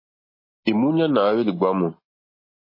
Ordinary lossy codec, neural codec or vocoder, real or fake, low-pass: MP3, 24 kbps; none; real; 5.4 kHz